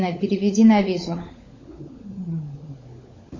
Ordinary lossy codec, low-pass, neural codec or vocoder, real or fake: MP3, 32 kbps; 7.2 kHz; codec, 16 kHz, 16 kbps, FunCodec, trained on LibriTTS, 50 frames a second; fake